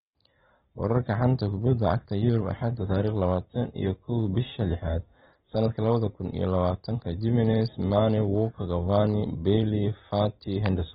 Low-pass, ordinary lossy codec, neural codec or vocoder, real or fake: 10.8 kHz; AAC, 16 kbps; none; real